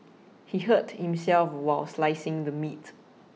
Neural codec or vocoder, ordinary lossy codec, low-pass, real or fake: none; none; none; real